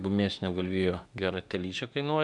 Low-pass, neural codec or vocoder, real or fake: 10.8 kHz; autoencoder, 48 kHz, 32 numbers a frame, DAC-VAE, trained on Japanese speech; fake